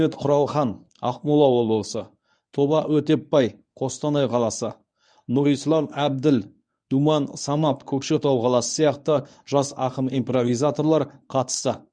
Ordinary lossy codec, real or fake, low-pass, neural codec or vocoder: none; fake; 9.9 kHz; codec, 24 kHz, 0.9 kbps, WavTokenizer, medium speech release version 1